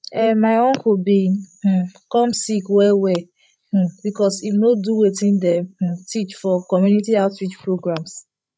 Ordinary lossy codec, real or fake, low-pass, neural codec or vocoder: none; fake; none; codec, 16 kHz, 16 kbps, FreqCodec, larger model